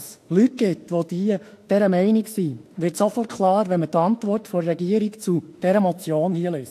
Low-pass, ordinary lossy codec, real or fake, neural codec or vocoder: 14.4 kHz; AAC, 64 kbps; fake; autoencoder, 48 kHz, 32 numbers a frame, DAC-VAE, trained on Japanese speech